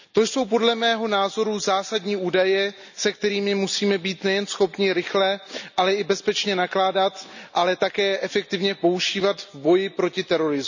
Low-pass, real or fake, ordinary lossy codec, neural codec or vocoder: 7.2 kHz; real; none; none